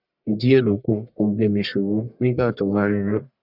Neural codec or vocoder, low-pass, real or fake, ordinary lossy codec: codec, 44.1 kHz, 1.7 kbps, Pupu-Codec; 5.4 kHz; fake; none